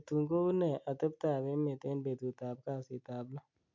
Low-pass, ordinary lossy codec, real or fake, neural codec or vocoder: 7.2 kHz; MP3, 64 kbps; real; none